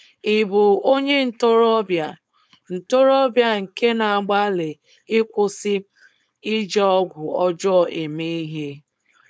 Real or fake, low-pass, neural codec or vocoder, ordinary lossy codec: fake; none; codec, 16 kHz, 4.8 kbps, FACodec; none